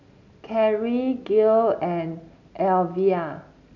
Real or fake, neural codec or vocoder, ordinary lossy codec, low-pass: real; none; none; 7.2 kHz